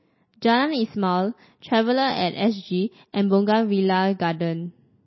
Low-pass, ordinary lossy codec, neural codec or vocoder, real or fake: 7.2 kHz; MP3, 24 kbps; none; real